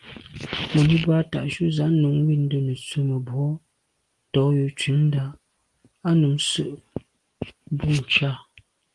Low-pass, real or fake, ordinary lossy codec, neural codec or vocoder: 10.8 kHz; real; Opus, 24 kbps; none